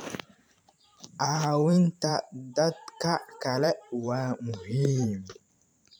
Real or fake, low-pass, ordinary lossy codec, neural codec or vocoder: fake; none; none; vocoder, 44.1 kHz, 128 mel bands every 512 samples, BigVGAN v2